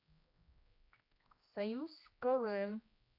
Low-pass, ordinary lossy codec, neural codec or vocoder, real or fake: 5.4 kHz; AAC, 48 kbps; codec, 16 kHz, 1 kbps, X-Codec, HuBERT features, trained on general audio; fake